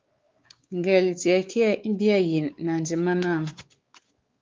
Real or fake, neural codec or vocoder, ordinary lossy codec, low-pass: fake; codec, 16 kHz, 4 kbps, X-Codec, WavLM features, trained on Multilingual LibriSpeech; Opus, 24 kbps; 7.2 kHz